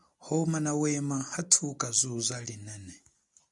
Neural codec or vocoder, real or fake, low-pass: none; real; 10.8 kHz